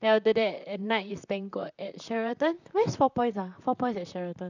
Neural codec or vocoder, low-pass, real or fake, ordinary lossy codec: vocoder, 44.1 kHz, 128 mel bands, Pupu-Vocoder; 7.2 kHz; fake; none